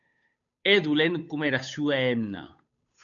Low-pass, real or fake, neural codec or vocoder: 7.2 kHz; fake; codec, 16 kHz, 8 kbps, FunCodec, trained on Chinese and English, 25 frames a second